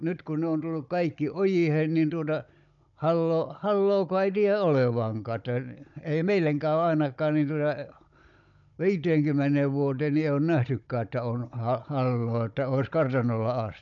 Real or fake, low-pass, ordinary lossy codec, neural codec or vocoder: fake; 7.2 kHz; none; codec, 16 kHz, 16 kbps, FunCodec, trained on Chinese and English, 50 frames a second